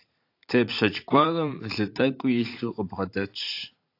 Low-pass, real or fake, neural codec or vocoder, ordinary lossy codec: 5.4 kHz; fake; vocoder, 44.1 kHz, 80 mel bands, Vocos; AAC, 32 kbps